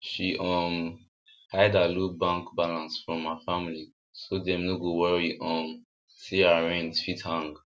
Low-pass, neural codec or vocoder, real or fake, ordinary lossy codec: none; none; real; none